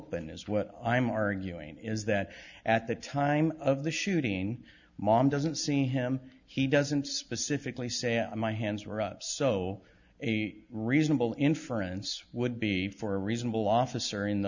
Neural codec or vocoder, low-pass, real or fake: none; 7.2 kHz; real